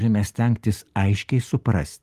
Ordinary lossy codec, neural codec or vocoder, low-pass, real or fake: Opus, 24 kbps; none; 14.4 kHz; real